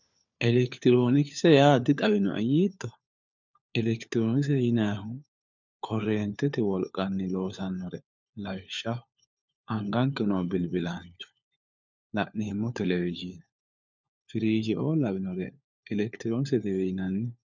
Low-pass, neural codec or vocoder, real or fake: 7.2 kHz; codec, 16 kHz, 4 kbps, FunCodec, trained on LibriTTS, 50 frames a second; fake